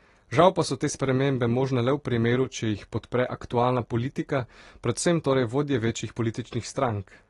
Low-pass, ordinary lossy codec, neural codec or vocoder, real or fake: 10.8 kHz; AAC, 32 kbps; none; real